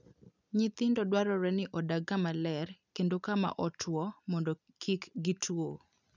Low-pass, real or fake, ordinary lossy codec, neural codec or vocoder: 7.2 kHz; real; none; none